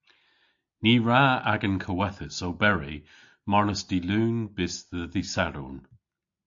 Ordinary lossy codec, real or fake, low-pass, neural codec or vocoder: AAC, 64 kbps; real; 7.2 kHz; none